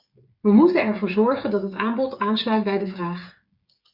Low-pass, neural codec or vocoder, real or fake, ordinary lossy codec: 5.4 kHz; codec, 16 kHz, 8 kbps, FreqCodec, smaller model; fake; Opus, 64 kbps